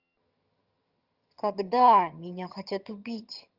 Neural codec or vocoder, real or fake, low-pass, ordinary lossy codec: vocoder, 22.05 kHz, 80 mel bands, HiFi-GAN; fake; 5.4 kHz; Opus, 24 kbps